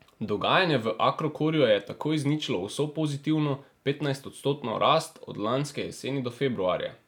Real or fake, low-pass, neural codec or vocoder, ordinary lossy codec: real; 19.8 kHz; none; none